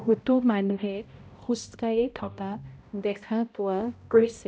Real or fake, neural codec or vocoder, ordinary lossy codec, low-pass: fake; codec, 16 kHz, 0.5 kbps, X-Codec, HuBERT features, trained on balanced general audio; none; none